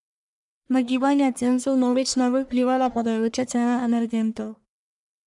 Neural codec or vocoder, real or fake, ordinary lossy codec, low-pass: codec, 44.1 kHz, 1.7 kbps, Pupu-Codec; fake; none; 10.8 kHz